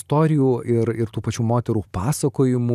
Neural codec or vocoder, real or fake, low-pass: vocoder, 44.1 kHz, 128 mel bands every 512 samples, BigVGAN v2; fake; 14.4 kHz